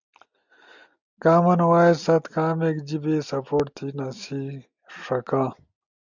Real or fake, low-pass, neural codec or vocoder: real; 7.2 kHz; none